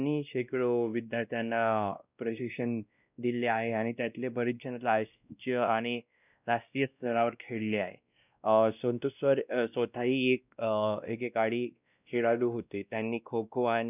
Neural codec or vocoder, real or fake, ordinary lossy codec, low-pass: codec, 16 kHz, 1 kbps, X-Codec, WavLM features, trained on Multilingual LibriSpeech; fake; none; 3.6 kHz